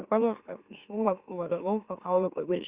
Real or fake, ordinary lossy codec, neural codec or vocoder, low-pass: fake; Opus, 64 kbps; autoencoder, 44.1 kHz, a latent of 192 numbers a frame, MeloTTS; 3.6 kHz